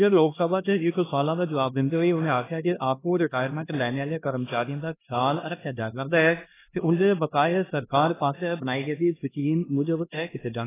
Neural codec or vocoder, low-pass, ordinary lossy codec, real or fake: codec, 16 kHz, 1 kbps, X-Codec, HuBERT features, trained on LibriSpeech; 3.6 kHz; AAC, 16 kbps; fake